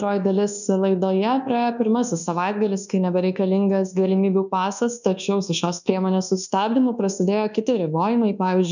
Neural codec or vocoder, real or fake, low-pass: codec, 24 kHz, 1.2 kbps, DualCodec; fake; 7.2 kHz